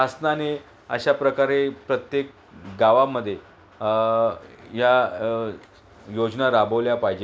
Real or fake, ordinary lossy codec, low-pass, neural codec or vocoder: real; none; none; none